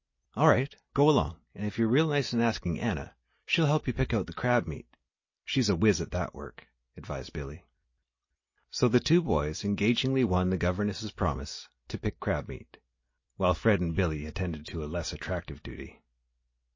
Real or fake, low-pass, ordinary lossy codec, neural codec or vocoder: real; 7.2 kHz; MP3, 32 kbps; none